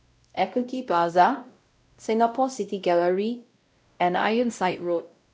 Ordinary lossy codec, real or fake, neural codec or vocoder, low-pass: none; fake; codec, 16 kHz, 0.5 kbps, X-Codec, WavLM features, trained on Multilingual LibriSpeech; none